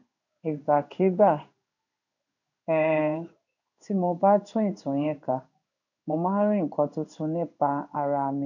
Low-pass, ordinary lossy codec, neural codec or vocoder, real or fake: 7.2 kHz; none; codec, 16 kHz in and 24 kHz out, 1 kbps, XY-Tokenizer; fake